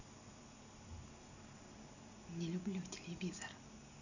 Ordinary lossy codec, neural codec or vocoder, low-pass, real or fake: none; none; 7.2 kHz; real